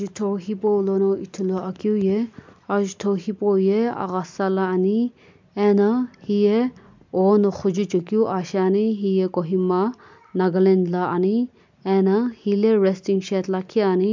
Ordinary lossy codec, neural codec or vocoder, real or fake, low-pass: MP3, 64 kbps; none; real; 7.2 kHz